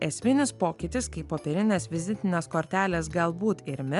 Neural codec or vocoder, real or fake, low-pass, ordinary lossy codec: none; real; 10.8 kHz; MP3, 96 kbps